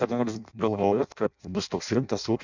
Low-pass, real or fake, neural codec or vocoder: 7.2 kHz; fake; codec, 16 kHz in and 24 kHz out, 0.6 kbps, FireRedTTS-2 codec